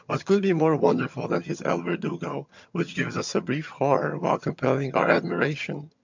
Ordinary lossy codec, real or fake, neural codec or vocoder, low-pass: MP3, 64 kbps; fake; vocoder, 22.05 kHz, 80 mel bands, HiFi-GAN; 7.2 kHz